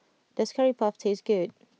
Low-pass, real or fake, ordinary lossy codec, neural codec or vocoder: none; real; none; none